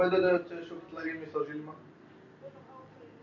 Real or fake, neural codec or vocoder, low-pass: real; none; 7.2 kHz